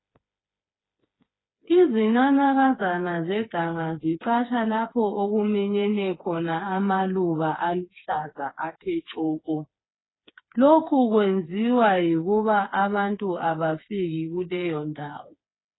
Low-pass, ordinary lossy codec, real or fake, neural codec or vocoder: 7.2 kHz; AAC, 16 kbps; fake; codec, 16 kHz, 4 kbps, FreqCodec, smaller model